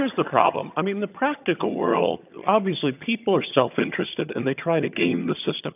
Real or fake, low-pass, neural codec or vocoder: fake; 3.6 kHz; vocoder, 22.05 kHz, 80 mel bands, HiFi-GAN